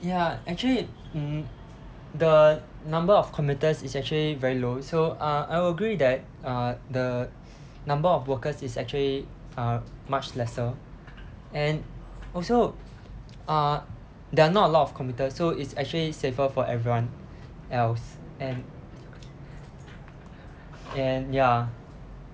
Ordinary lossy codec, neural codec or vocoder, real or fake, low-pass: none; none; real; none